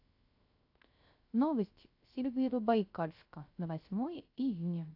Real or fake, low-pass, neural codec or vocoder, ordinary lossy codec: fake; 5.4 kHz; codec, 16 kHz, 0.3 kbps, FocalCodec; AAC, 32 kbps